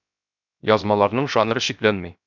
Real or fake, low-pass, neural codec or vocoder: fake; 7.2 kHz; codec, 16 kHz, 0.7 kbps, FocalCodec